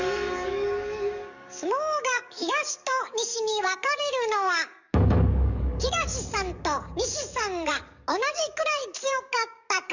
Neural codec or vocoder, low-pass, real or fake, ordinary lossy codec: codec, 44.1 kHz, 7.8 kbps, DAC; 7.2 kHz; fake; none